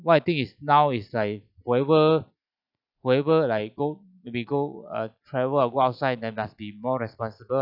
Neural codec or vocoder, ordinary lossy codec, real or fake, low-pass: none; none; real; 5.4 kHz